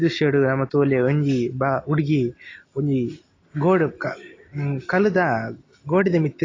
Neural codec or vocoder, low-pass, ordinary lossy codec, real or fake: none; 7.2 kHz; AAC, 32 kbps; real